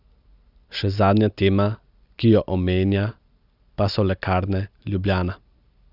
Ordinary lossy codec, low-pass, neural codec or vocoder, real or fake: Opus, 64 kbps; 5.4 kHz; none; real